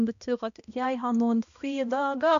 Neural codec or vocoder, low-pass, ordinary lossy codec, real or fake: codec, 16 kHz, 1 kbps, X-Codec, HuBERT features, trained on balanced general audio; 7.2 kHz; none; fake